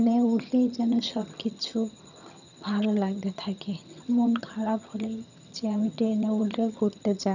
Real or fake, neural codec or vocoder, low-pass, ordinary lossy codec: fake; vocoder, 22.05 kHz, 80 mel bands, HiFi-GAN; 7.2 kHz; none